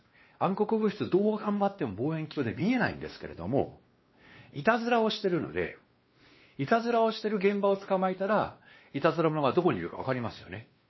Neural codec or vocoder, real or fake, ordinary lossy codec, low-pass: codec, 16 kHz, 2 kbps, X-Codec, WavLM features, trained on Multilingual LibriSpeech; fake; MP3, 24 kbps; 7.2 kHz